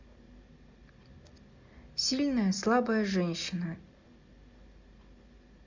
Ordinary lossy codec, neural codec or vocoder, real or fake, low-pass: MP3, 64 kbps; none; real; 7.2 kHz